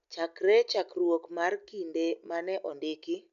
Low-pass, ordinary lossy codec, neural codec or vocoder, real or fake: 7.2 kHz; none; none; real